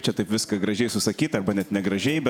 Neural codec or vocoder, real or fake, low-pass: vocoder, 48 kHz, 128 mel bands, Vocos; fake; 19.8 kHz